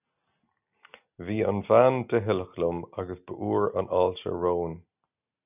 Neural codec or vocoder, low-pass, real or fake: none; 3.6 kHz; real